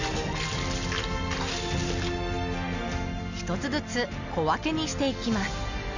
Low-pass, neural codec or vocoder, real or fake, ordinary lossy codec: 7.2 kHz; none; real; none